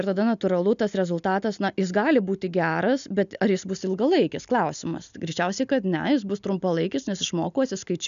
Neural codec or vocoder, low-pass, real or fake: none; 7.2 kHz; real